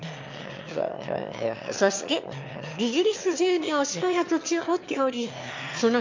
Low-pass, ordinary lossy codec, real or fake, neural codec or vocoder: 7.2 kHz; MP3, 48 kbps; fake; autoencoder, 22.05 kHz, a latent of 192 numbers a frame, VITS, trained on one speaker